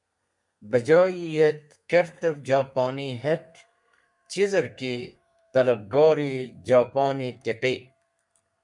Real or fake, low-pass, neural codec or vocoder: fake; 10.8 kHz; codec, 32 kHz, 1.9 kbps, SNAC